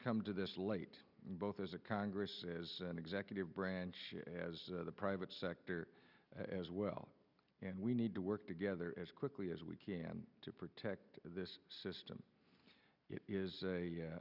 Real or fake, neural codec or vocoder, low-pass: real; none; 5.4 kHz